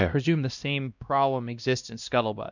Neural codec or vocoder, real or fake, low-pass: codec, 16 kHz, 1 kbps, X-Codec, WavLM features, trained on Multilingual LibriSpeech; fake; 7.2 kHz